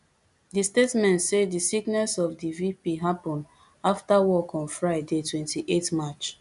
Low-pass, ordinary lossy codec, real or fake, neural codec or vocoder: 10.8 kHz; none; real; none